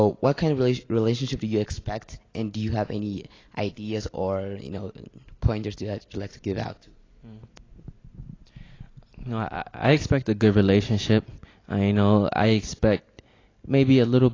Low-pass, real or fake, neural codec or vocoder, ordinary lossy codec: 7.2 kHz; real; none; AAC, 32 kbps